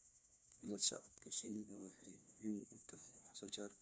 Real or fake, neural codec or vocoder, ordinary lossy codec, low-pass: fake; codec, 16 kHz, 1 kbps, FunCodec, trained on LibriTTS, 50 frames a second; none; none